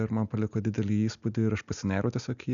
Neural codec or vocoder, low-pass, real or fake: none; 7.2 kHz; real